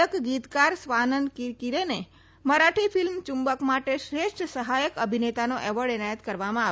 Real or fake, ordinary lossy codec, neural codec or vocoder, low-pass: real; none; none; none